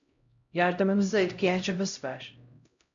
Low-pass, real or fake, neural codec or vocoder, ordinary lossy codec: 7.2 kHz; fake; codec, 16 kHz, 0.5 kbps, X-Codec, HuBERT features, trained on LibriSpeech; MP3, 64 kbps